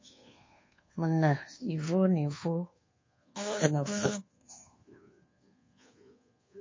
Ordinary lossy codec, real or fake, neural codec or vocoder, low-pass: MP3, 32 kbps; fake; codec, 24 kHz, 1.2 kbps, DualCodec; 7.2 kHz